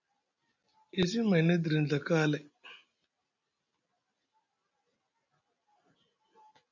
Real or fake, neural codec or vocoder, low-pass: real; none; 7.2 kHz